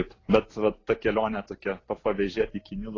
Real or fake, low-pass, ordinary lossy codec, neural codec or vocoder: real; 7.2 kHz; AAC, 32 kbps; none